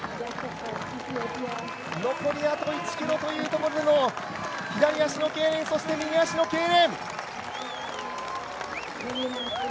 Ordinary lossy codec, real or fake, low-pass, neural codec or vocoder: none; real; none; none